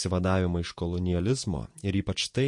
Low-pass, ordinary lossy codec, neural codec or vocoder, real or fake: 10.8 kHz; MP3, 48 kbps; vocoder, 48 kHz, 128 mel bands, Vocos; fake